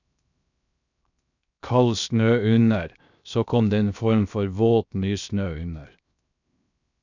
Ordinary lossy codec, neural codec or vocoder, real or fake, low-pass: none; codec, 16 kHz, 0.7 kbps, FocalCodec; fake; 7.2 kHz